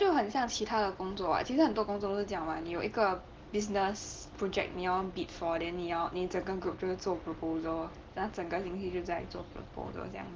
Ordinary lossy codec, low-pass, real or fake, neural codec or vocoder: Opus, 16 kbps; 7.2 kHz; real; none